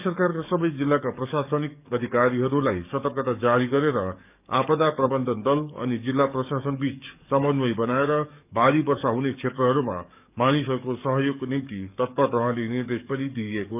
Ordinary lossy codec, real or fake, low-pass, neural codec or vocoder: none; fake; 3.6 kHz; codec, 44.1 kHz, 7.8 kbps, DAC